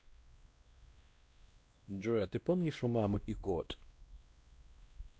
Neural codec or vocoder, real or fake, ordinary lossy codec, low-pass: codec, 16 kHz, 1 kbps, X-Codec, WavLM features, trained on Multilingual LibriSpeech; fake; none; none